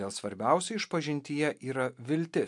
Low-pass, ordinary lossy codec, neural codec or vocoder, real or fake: 10.8 kHz; MP3, 64 kbps; none; real